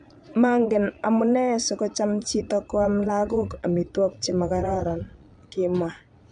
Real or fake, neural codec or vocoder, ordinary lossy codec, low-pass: fake; vocoder, 22.05 kHz, 80 mel bands, Vocos; none; 9.9 kHz